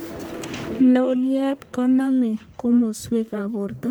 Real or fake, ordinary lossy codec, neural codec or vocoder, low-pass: fake; none; codec, 44.1 kHz, 1.7 kbps, Pupu-Codec; none